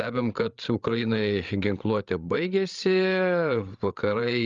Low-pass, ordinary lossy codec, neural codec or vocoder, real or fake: 7.2 kHz; Opus, 32 kbps; codec, 16 kHz, 8 kbps, FreqCodec, larger model; fake